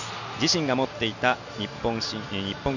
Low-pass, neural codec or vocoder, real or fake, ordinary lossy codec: 7.2 kHz; none; real; none